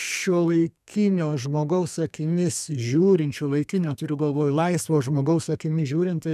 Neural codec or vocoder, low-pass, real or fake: codec, 32 kHz, 1.9 kbps, SNAC; 14.4 kHz; fake